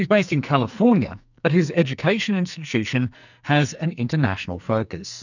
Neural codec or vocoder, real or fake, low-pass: codec, 44.1 kHz, 2.6 kbps, SNAC; fake; 7.2 kHz